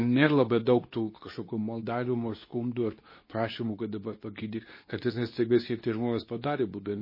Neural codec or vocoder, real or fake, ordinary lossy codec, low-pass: codec, 24 kHz, 0.9 kbps, WavTokenizer, medium speech release version 2; fake; MP3, 24 kbps; 5.4 kHz